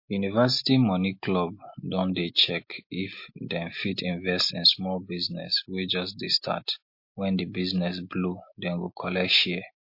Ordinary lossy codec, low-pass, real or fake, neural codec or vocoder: MP3, 32 kbps; 5.4 kHz; real; none